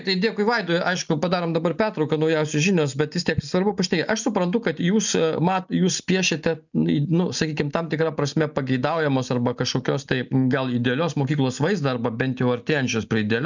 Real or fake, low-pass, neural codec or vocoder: real; 7.2 kHz; none